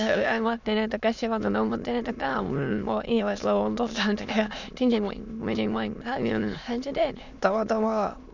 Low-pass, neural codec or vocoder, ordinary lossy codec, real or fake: 7.2 kHz; autoencoder, 22.05 kHz, a latent of 192 numbers a frame, VITS, trained on many speakers; none; fake